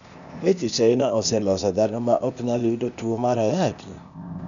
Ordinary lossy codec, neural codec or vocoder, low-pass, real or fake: none; codec, 16 kHz, 0.8 kbps, ZipCodec; 7.2 kHz; fake